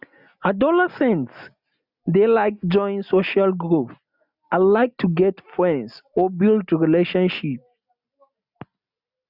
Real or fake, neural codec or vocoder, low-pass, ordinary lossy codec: real; none; 5.4 kHz; none